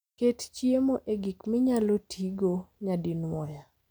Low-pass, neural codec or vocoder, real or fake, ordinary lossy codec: none; none; real; none